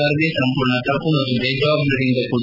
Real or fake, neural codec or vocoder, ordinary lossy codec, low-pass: real; none; none; 5.4 kHz